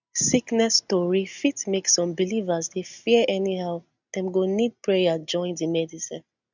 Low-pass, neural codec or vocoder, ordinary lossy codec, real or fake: 7.2 kHz; none; none; real